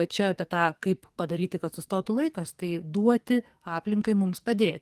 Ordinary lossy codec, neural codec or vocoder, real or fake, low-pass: Opus, 24 kbps; codec, 44.1 kHz, 2.6 kbps, SNAC; fake; 14.4 kHz